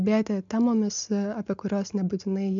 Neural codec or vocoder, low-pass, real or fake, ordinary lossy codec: none; 7.2 kHz; real; MP3, 64 kbps